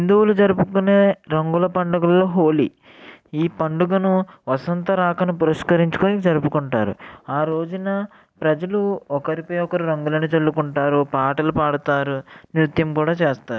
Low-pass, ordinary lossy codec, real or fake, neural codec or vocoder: none; none; real; none